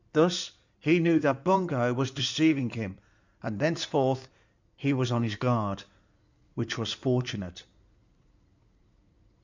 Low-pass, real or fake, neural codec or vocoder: 7.2 kHz; fake; codec, 16 kHz in and 24 kHz out, 2.2 kbps, FireRedTTS-2 codec